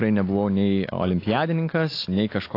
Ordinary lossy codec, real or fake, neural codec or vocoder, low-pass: AAC, 32 kbps; real; none; 5.4 kHz